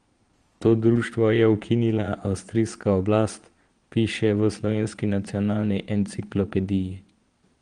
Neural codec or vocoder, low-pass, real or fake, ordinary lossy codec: vocoder, 22.05 kHz, 80 mel bands, Vocos; 9.9 kHz; fake; Opus, 24 kbps